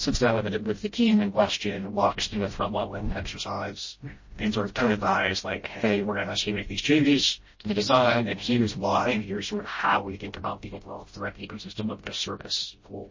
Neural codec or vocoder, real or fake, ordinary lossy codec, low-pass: codec, 16 kHz, 0.5 kbps, FreqCodec, smaller model; fake; MP3, 32 kbps; 7.2 kHz